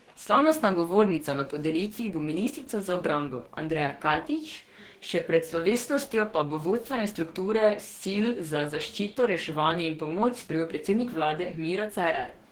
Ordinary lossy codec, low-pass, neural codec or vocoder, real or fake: Opus, 16 kbps; 19.8 kHz; codec, 44.1 kHz, 2.6 kbps, DAC; fake